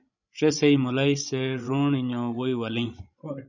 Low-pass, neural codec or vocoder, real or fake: 7.2 kHz; codec, 16 kHz, 16 kbps, FreqCodec, larger model; fake